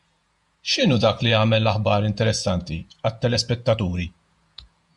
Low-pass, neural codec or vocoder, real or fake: 10.8 kHz; vocoder, 48 kHz, 128 mel bands, Vocos; fake